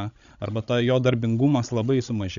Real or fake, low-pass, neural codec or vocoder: fake; 7.2 kHz; codec, 16 kHz, 16 kbps, FreqCodec, larger model